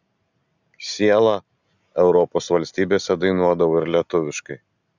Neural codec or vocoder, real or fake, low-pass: none; real; 7.2 kHz